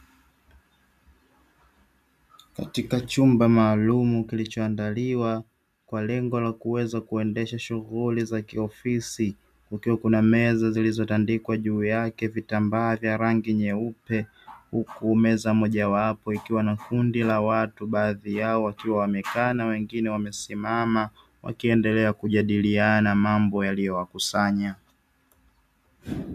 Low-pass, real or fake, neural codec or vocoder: 14.4 kHz; real; none